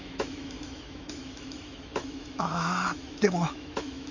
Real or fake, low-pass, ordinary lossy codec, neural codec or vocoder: fake; 7.2 kHz; none; autoencoder, 48 kHz, 128 numbers a frame, DAC-VAE, trained on Japanese speech